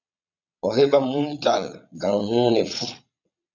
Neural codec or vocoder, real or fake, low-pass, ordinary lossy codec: vocoder, 22.05 kHz, 80 mel bands, Vocos; fake; 7.2 kHz; MP3, 64 kbps